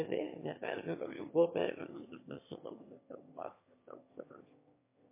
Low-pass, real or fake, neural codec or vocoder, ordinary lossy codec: 3.6 kHz; fake; autoencoder, 22.05 kHz, a latent of 192 numbers a frame, VITS, trained on one speaker; MP3, 24 kbps